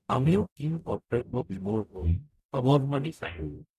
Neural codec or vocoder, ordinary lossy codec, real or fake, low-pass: codec, 44.1 kHz, 0.9 kbps, DAC; none; fake; 14.4 kHz